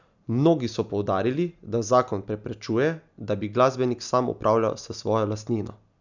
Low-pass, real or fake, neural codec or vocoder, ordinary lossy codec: 7.2 kHz; real; none; none